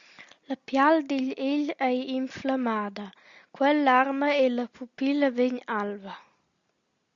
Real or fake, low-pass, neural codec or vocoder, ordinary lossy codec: real; 7.2 kHz; none; Opus, 64 kbps